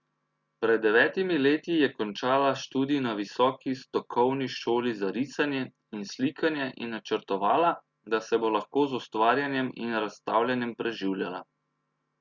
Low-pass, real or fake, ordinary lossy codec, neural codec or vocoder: 7.2 kHz; real; Opus, 64 kbps; none